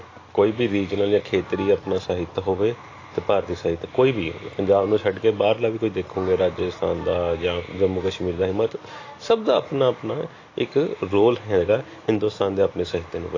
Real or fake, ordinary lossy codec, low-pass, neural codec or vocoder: real; AAC, 32 kbps; 7.2 kHz; none